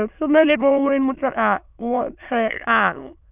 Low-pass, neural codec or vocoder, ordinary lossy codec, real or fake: 3.6 kHz; autoencoder, 22.05 kHz, a latent of 192 numbers a frame, VITS, trained on many speakers; none; fake